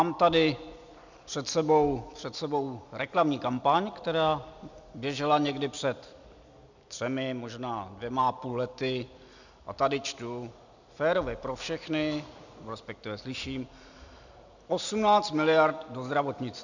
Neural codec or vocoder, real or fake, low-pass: none; real; 7.2 kHz